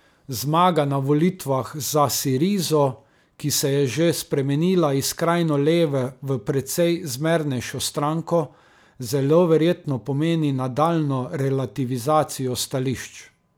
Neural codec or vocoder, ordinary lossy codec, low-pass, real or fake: none; none; none; real